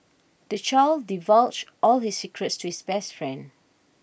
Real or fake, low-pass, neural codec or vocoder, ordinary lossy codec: real; none; none; none